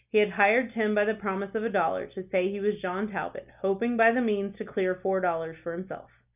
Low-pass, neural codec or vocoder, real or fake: 3.6 kHz; none; real